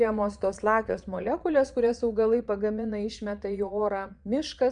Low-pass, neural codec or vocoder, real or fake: 9.9 kHz; vocoder, 22.05 kHz, 80 mel bands, Vocos; fake